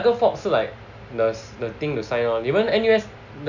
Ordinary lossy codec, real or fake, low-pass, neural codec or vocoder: none; real; 7.2 kHz; none